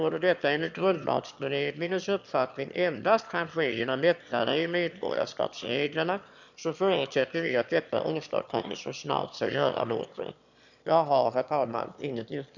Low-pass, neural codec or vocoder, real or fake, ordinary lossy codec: 7.2 kHz; autoencoder, 22.05 kHz, a latent of 192 numbers a frame, VITS, trained on one speaker; fake; none